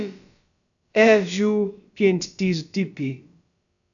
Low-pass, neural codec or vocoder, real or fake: 7.2 kHz; codec, 16 kHz, about 1 kbps, DyCAST, with the encoder's durations; fake